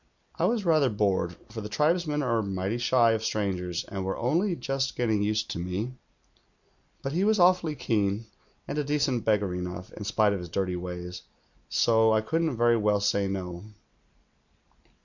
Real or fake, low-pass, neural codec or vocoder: real; 7.2 kHz; none